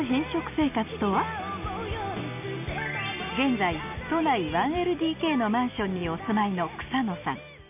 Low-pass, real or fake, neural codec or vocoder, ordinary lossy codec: 3.6 kHz; real; none; none